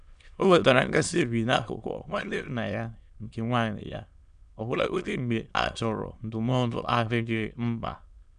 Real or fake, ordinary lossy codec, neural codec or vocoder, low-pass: fake; none; autoencoder, 22.05 kHz, a latent of 192 numbers a frame, VITS, trained on many speakers; 9.9 kHz